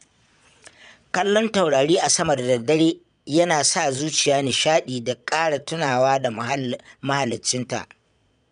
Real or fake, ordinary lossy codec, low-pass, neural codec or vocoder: fake; none; 9.9 kHz; vocoder, 22.05 kHz, 80 mel bands, Vocos